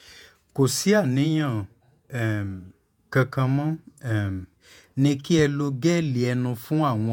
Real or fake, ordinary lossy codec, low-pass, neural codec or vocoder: fake; none; 19.8 kHz; vocoder, 48 kHz, 128 mel bands, Vocos